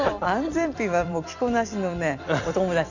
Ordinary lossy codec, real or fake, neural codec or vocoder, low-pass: none; fake; vocoder, 44.1 kHz, 128 mel bands every 256 samples, BigVGAN v2; 7.2 kHz